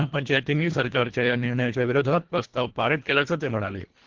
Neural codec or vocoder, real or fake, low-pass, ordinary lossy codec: codec, 24 kHz, 1.5 kbps, HILCodec; fake; 7.2 kHz; Opus, 16 kbps